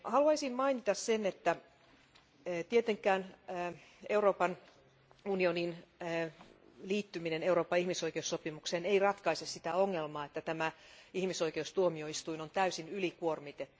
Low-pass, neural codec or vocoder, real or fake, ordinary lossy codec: none; none; real; none